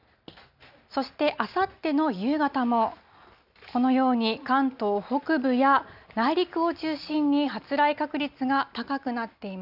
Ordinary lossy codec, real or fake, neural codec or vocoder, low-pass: none; real; none; 5.4 kHz